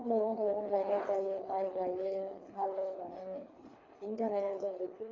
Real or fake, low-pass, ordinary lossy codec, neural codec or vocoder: fake; 7.2 kHz; AAC, 32 kbps; codec, 24 kHz, 3 kbps, HILCodec